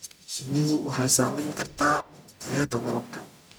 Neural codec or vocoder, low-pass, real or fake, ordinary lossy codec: codec, 44.1 kHz, 0.9 kbps, DAC; none; fake; none